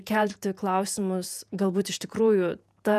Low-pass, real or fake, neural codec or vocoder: 14.4 kHz; fake; vocoder, 48 kHz, 128 mel bands, Vocos